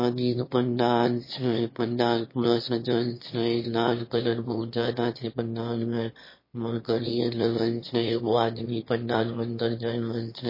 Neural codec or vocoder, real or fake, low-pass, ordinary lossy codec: autoencoder, 22.05 kHz, a latent of 192 numbers a frame, VITS, trained on one speaker; fake; 5.4 kHz; MP3, 24 kbps